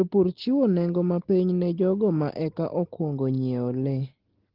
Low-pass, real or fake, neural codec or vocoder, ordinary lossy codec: 5.4 kHz; real; none; Opus, 16 kbps